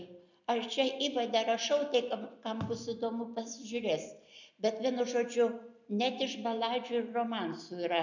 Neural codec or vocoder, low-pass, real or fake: none; 7.2 kHz; real